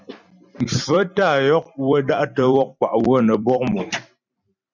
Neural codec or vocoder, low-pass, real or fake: vocoder, 44.1 kHz, 128 mel bands every 256 samples, BigVGAN v2; 7.2 kHz; fake